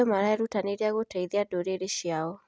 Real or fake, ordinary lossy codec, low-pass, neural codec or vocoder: real; none; none; none